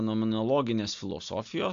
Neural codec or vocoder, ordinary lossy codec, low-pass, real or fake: none; AAC, 64 kbps; 7.2 kHz; real